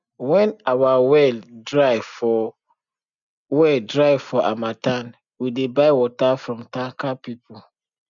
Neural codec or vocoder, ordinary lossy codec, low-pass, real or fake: none; none; 7.2 kHz; real